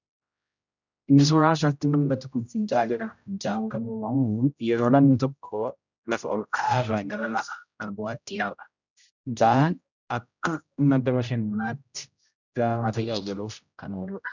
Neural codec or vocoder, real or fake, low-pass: codec, 16 kHz, 0.5 kbps, X-Codec, HuBERT features, trained on general audio; fake; 7.2 kHz